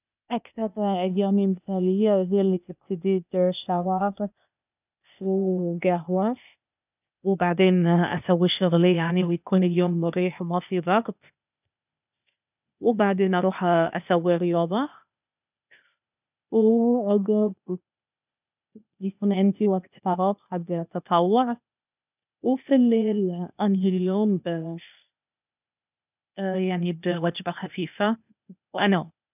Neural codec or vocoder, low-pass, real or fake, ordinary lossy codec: codec, 16 kHz, 0.8 kbps, ZipCodec; 3.6 kHz; fake; none